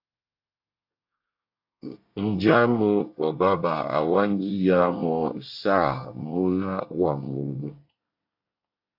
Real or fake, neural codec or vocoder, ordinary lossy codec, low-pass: fake; codec, 24 kHz, 1 kbps, SNAC; AAC, 48 kbps; 5.4 kHz